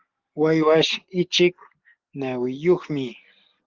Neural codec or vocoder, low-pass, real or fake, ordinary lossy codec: none; 7.2 kHz; real; Opus, 16 kbps